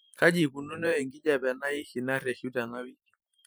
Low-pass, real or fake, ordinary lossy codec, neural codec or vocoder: none; fake; none; vocoder, 44.1 kHz, 128 mel bands every 512 samples, BigVGAN v2